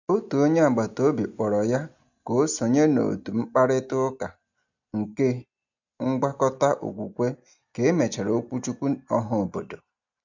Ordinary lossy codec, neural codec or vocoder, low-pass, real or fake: none; none; 7.2 kHz; real